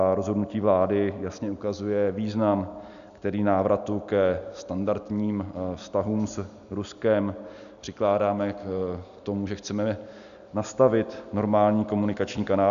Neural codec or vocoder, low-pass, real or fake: none; 7.2 kHz; real